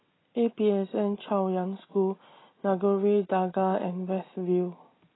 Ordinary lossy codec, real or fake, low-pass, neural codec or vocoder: AAC, 16 kbps; real; 7.2 kHz; none